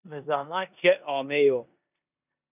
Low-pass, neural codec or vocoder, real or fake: 3.6 kHz; codec, 16 kHz in and 24 kHz out, 0.9 kbps, LongCat-Audio-Codec, four codebook decoder; fake